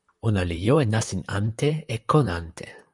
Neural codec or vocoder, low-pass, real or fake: vocoder, 44.1 kHz, 128 mel bands, Pupu-Vocoder; 10.8 kHz; fake